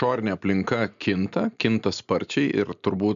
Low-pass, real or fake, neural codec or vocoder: 7.2 kHz; real; none